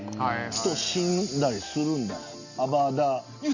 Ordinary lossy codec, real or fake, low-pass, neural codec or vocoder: none; real; 7.2 kHz; none